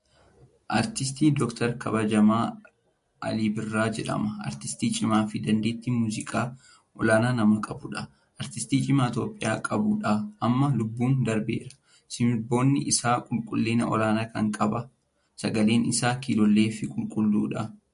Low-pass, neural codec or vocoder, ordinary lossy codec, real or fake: 10.8 kHz; none; MP3, 48 kbps; real